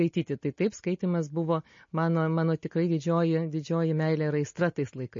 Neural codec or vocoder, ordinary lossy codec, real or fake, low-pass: none; MP3, 32 kbps; real; 7.2 kHz